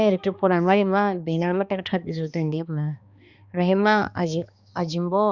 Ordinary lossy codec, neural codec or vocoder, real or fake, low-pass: Opus, 64 kbps; codec, 16 kHz, 2 kbps, X-Codec, HuBERT features, trained on balanced general audio; fake; 7.2 kHz